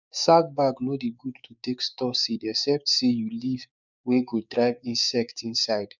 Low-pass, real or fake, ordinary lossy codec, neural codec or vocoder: 7.2 kHz; fake; none; codec, 16 kHz, 6 kbps, DAC